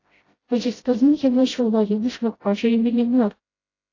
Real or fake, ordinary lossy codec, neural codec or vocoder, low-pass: fake; AAC, 32 kbps; codec, 16 kHz, 0.5 kbps, FreqCodec, smaller model; 7.2 kHz